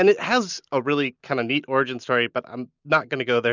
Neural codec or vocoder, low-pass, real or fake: none; 7.2 kHz; real